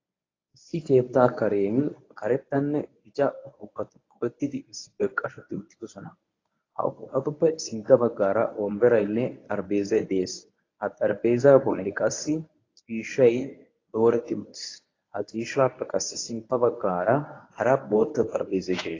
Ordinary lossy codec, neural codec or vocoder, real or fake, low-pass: AAC, 32 kbps; codec, 24 kHz, 0.9 kbps, WavTokenizer, medium speech release version 1; fake; 7.2 kHz